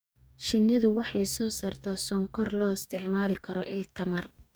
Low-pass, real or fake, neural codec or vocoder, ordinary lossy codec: none; fake; codec, 44.1 kHz, 2.6 kbps, DAC; none